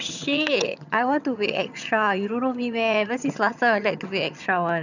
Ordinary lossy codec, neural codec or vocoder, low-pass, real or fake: none; vocoder, 22.05 kHz, 80 mel bands, HiFi-GAN; 7.2 kHz; fake